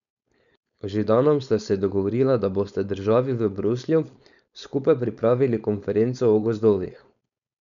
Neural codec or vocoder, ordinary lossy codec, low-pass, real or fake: codec, 16 kHz, 4.8 kbps, FACodec; none; 7.2 kHz; fake